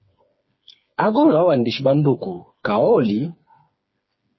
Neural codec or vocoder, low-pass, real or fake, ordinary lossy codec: codec, 16 kHz, 4 kbps, FreqCodec, smaller model; 7.2 kHz; fake; MP3, 24 kbps